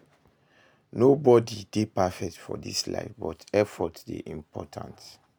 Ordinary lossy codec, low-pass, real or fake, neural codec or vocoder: none; none; real; none